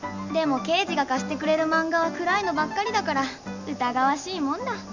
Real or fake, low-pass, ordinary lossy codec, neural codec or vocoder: real; 7.2 kHz; none; none